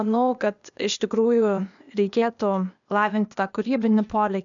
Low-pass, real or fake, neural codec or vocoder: 7.2 kHz; fake; codec, 16 kHz, 0.8 kbps, ZipCodec